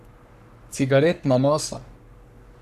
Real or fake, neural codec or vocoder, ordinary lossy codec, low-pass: fake; codec, 44.1 kHz, 3.4 kbps, Pupu-Codec; none; 14.4 kHz